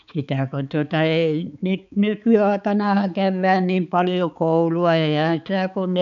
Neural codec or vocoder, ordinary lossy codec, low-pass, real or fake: codec, 16 kHz, 4 kbps, X-Codec, HuBERT features, trained on balanced general audio; none; 7.2 kHz; fake